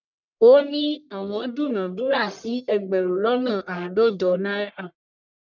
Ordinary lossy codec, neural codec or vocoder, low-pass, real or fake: none; codec, 44.1 kHz, 1.7 kbps, Pupu-Codec; 7.2 kHz; fake